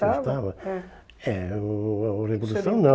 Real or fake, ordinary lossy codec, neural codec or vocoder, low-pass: real; none; none; none